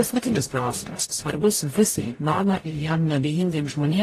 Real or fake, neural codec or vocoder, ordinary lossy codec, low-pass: fake; codec, 44.1 kHz, 0.9 kbps, DAC; AAC, 48 kbps; 14.4 kHz